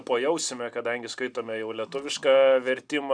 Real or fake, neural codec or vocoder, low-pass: real; none; 9.9 kHz